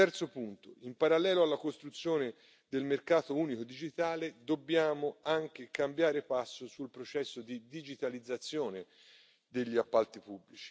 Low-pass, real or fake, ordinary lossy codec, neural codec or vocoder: none; real; none; none